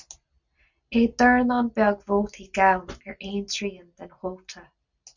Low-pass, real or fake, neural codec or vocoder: 7.2 kHz; real; none